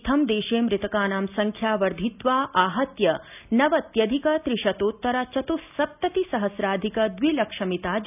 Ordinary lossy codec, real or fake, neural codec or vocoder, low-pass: none; real; none; 3.6 kHz